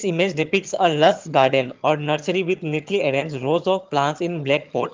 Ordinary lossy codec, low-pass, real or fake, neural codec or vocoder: Opus, 32 kbps; 7.2 kHz; fake; vocoder, 22.05 kHz, 80 mel bands, HiFi-GAN